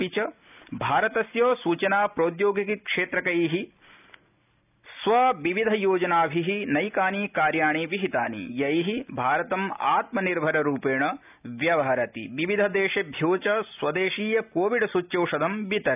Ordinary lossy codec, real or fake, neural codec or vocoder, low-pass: none; real; none; 3.6 kHz